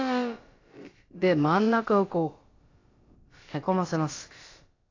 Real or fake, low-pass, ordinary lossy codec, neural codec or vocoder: fake; 7.2 kHz; AAC, 32 kbps; codec, 16 kHz, about 1 kbps, DyCAST, with the encoder's durations